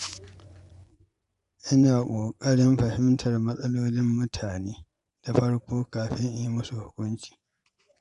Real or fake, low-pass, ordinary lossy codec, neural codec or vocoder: fake; 10.8 kHz; none; vocoder, 24 kHz, 100 mel bands, Vocos